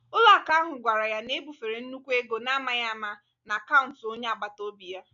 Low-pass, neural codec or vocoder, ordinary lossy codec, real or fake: 7.2 kHz; none; none; real